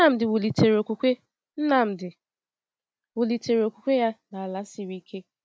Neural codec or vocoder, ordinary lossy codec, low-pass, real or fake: none; none; none; real